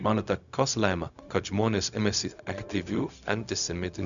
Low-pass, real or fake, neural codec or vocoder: 7.2 kHz; fake; codec, 16 kHz, 0.4 kbps, LongCat-Audio-Codec